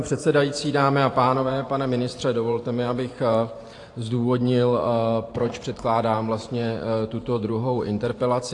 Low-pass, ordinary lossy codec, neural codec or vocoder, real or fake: 10.8 kHz; AAC, 48 kbps; vocoder, 44.1 kHz, 128 mel bands every 512 samples, BigVGAN v2; fake